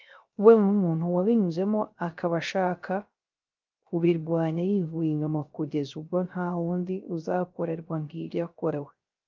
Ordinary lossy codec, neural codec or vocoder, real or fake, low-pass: Opus, 24 kbps; codec, 16 kHz, 0.3 kbps, FocalCodec; fake; 7.2 kHz